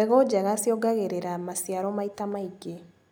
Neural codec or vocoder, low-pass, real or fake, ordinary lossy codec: none; none; real; none